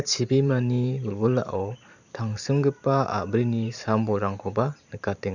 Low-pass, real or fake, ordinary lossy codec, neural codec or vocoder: 7.2 kHz; fake; none; vocoder, 44.1 kHz, 128 mel bands every 512 samples, BigVGAN v2